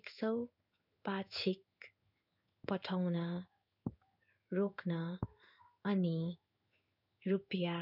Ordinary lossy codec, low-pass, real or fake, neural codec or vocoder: none; 5.4 kHz; fake; codec, 16 kHz in and 24 kHz out, 1 kbps, XY-Tokenizer